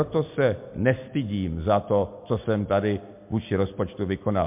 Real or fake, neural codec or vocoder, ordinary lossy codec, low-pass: real; none; MP3, 32 kbps; 3.6 kHz